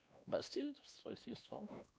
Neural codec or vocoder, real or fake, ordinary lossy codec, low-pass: codec, 16 kHz, 2 kbps, X-Codec, WavLM features, trained on Multilingual LibriSpeech; fake; none; none